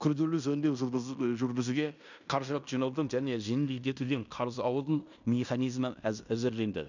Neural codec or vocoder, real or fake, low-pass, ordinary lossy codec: codec, 16 kHz in and 24 kHz out, 0.9 kbps, LongCat-Audio-Codec, fine tuned four codebook decoder; fake; 7.2 kHz; none